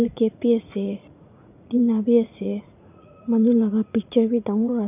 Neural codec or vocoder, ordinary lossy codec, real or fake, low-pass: vocoder, 44.1 kHz, 80 mel bands, Vocos; none; fake; 3.6 kHz